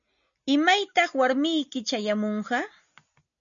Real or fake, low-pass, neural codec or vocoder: real; 7.2 kHz; none